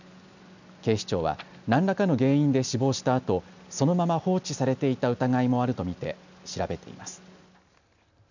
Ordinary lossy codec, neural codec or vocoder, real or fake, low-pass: none; none; real; 7.2 kHz